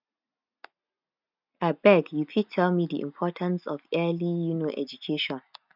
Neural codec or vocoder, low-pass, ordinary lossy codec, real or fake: none; 5.4 kHz; none; real